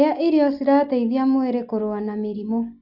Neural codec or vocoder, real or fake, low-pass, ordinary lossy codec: none; real; 5.4 kHz; none